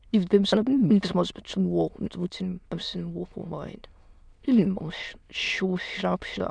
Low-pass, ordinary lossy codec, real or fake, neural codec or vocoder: 9.9 kHz; none; fake; autoencoder, 22.05 kHz, a latent of 192 numbers a frame, VITS, trained on many speakers